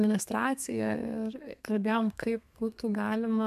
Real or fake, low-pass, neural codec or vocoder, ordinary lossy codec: fake; 14.4 kHz; codec, 32 kHz, 1.9 kbps, SNAC; AAC, 96 kbps